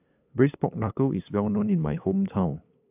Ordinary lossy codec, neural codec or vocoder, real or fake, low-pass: AAC, 32 kbps; codec, 16 kHz, 8 kbps, FunCodec, trained on LibriTTS, 25 frames a second; fake; 3.6 kHz